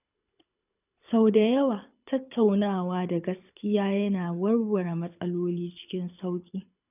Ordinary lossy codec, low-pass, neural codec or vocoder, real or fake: none; 3.6 kHz; codec, 24 kHz, 6 kbps, HILCodec; fake